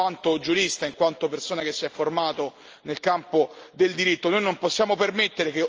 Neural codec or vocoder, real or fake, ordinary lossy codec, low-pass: none; real; Opus, 24 kbps; 7.2 kHz